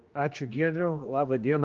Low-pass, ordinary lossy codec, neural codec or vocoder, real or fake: 7.2 kHz; Opus, 32 kbps; codec, 16 kHz, 2 kbps, X-Codec, HuBERT features, trained on general audio; fake